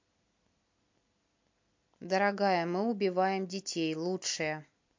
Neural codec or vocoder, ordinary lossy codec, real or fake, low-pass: none; MP3, 48 kbps; real; 7.2 kHz